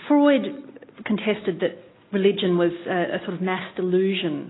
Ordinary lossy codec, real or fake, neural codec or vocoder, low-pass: AAC, 16 kbps; real; none; 7.2 kHz